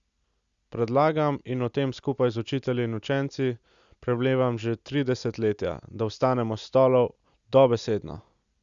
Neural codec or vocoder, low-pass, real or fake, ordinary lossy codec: none; 7.2 kHz; real; Opus, 64 kbps